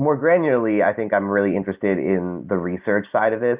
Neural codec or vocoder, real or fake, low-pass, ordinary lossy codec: none; real; 3.6 kHz; Opus, 24 kbps